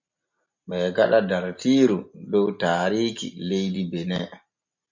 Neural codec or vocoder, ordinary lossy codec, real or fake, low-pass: none; MP3, 48 kbps; real; 7.2 kHz